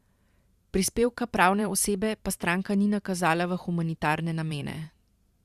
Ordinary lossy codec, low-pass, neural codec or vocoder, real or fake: Opus, 64 kbps; 14.4 kHz; vocoder, 44.1 kHz, 128 mel bands every 512 samples, BigVGAN v2; fake